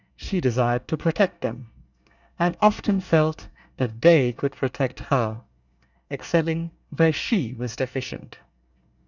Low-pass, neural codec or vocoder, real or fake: 7.2 kHz; codec, 24 kHz, 1 kbps, SNAC; fake